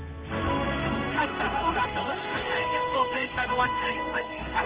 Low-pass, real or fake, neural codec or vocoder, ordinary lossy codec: 3.6 kHz; fake; codec, 16 kHz in and 24 kHz out, 1 kbps, XY-Tokenizer; Opus, 16 kbps